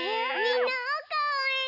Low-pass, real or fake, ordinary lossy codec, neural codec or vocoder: 5.4 kHz; real; none; none